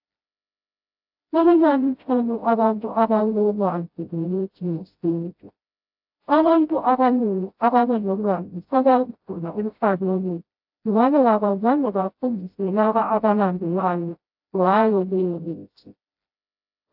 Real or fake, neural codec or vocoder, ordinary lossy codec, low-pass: fake; codec, 16 kHz, 0.5 kbps, FreqCodec, smaller model; AAC, 48 kbps; 5.4 kHz